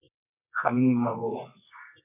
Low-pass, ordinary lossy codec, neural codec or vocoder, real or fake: 3.6 kHz; AAC, 24 kbps; codec, 24 kHz, 0.9 kbps, WavTokenizer, medium music audio release; fake